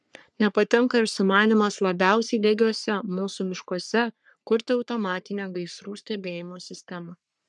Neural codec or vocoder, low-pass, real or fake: codec, 44.1 kHz, 3.4 kbps, Pupu-Codec; 10.8 kHz; fake